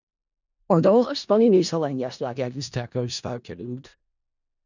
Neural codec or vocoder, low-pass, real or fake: codec, 16 kHz in and 24 kHz out, 0.4 kbps, LongCat-Audio-Codec, four codebook decoder; 7.2 kHz; fake